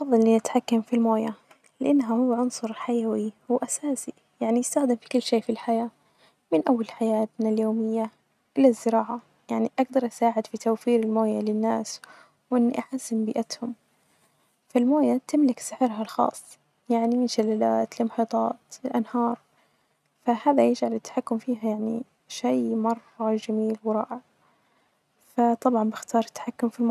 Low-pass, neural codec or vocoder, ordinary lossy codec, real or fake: 14.4 kHz; none; none; real